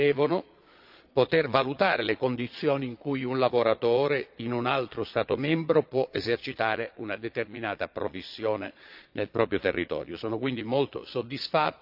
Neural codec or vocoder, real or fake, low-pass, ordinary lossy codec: vocoder, 22.05 kHz, 80 mel bands, Vocos; fake; 5.4 kHz; AAC, 48 kbps